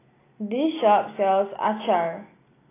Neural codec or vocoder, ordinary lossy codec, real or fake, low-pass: none; AAC, 16 kbps; real; 3.6 kHz